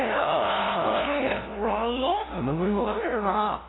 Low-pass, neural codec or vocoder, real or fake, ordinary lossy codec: 7.2 kHz; codec, 16 kHz, 0.5 kbps, FunCodec, trained on LibriTTS, 25 frames a second; fake; AAC, 16 kbps